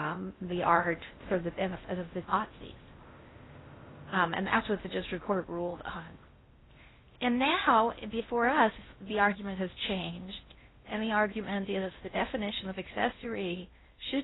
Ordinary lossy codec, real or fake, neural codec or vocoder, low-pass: AAC, 16 kbps; fake; codec, 16 kHz in and 24 kHz out, 0.6 kbps, FocalCodec, streaming, 4096 codes; 7.2 kHz